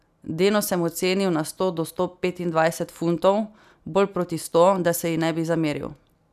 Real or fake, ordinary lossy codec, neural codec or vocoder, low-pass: real; none; none; 14.4 kHz